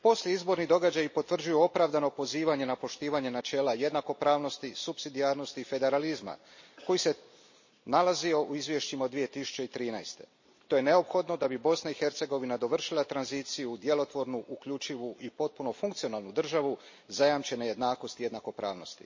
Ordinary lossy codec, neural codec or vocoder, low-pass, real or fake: MP3, 32 kbps; none; 7.2 kHz; real